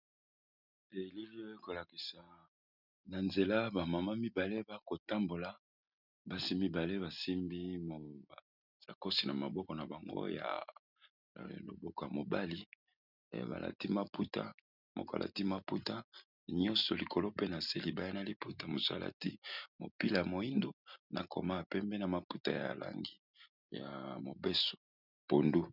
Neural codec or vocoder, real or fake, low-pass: none; real; 5.4 kHz